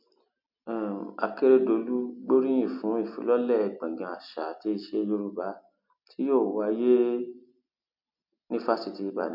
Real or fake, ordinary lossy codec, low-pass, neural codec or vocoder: real; MP3, 48 kbps; 5.4 kHz; none